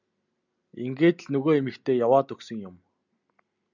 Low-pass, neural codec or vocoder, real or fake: 7.2 kHz; none; real